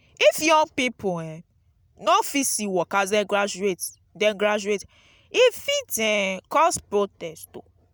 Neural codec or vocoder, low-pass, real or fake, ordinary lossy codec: none; none; real; none